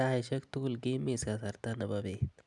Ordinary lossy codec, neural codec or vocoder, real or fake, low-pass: none; none; real; 10.8 kHz